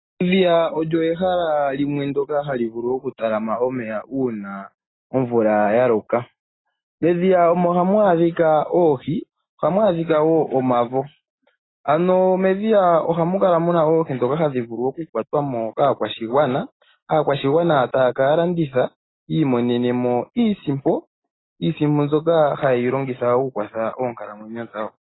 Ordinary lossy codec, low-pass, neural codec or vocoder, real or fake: AAC, 16 kbps; 7.2 kHz; none; real